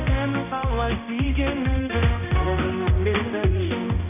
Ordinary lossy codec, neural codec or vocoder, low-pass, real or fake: none; codec, 16 kHz, 1 kbps, X-Codec, HuBERT features, trained on balanced general audio; 3.6 kHz; fake